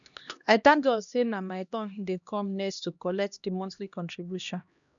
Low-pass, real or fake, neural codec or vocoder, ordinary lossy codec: 7.2 kHz; fake; codec, 16 kHz, 1 kbps, X-Codec, HuBERT features, trained on LibriSpeech; none